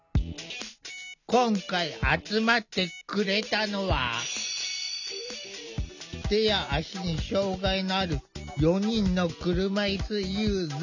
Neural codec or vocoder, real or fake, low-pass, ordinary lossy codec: none; real; 7.2 kHz; none